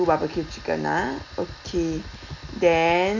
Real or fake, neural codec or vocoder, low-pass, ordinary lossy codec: real; none; 7.2 kHz; none